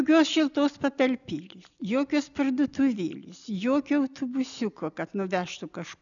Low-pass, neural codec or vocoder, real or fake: 7.2 kHz; none; real